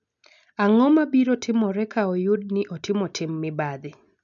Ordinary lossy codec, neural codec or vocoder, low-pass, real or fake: none; none; 7.2 kHz; real